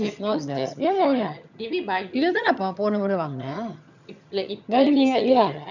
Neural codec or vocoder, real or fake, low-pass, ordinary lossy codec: vocoder, 22.05 kHz, 80 mel bands, HiFi-GAN; fake; 7.2 kHz; none